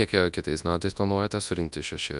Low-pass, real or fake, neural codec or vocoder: 10.8 kHz; fake; codec, 24 kHz, 0.9 kbps, WavTokenizer, large speech release